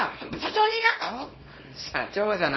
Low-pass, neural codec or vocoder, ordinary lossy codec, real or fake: 7.2 kHz; codec, 24 kHz, 0.9 kbps, WavTokenizer, small release; MP3, 24 kbps; fake